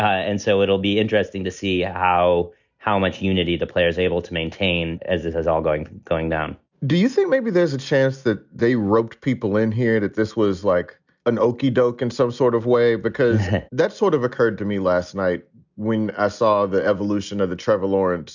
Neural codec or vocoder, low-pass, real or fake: none; 7.2 kHz; real